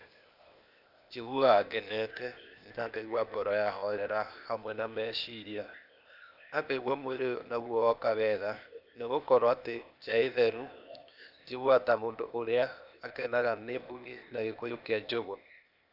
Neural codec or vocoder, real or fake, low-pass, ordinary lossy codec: codec, 16 kHz, 0.8 kbps, ZipCodec; fake; 5.4 kHz; none